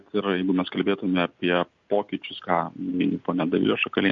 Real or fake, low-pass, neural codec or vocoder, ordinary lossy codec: real; 7.2 kHz; none; MP3, 48 kbps